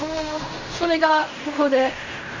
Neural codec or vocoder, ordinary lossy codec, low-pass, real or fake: codec, 16 kHz in and 24 kHz out, 0.4 kbps, LongCat-Audio-Codec, fine tuned four codebook decoder; MP3, 32 kbps; 7.2 kHz; fake